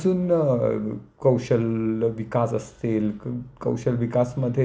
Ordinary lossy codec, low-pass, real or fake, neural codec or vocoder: none; none; real; none